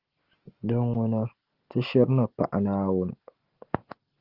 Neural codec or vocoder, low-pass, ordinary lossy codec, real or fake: none; 5.4 kHz; Opus, 16 kbps; real